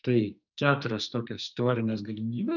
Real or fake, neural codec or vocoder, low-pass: fake; codec, 44.1 kHz, 2.6 kbps, SNAC; 7.2 kHz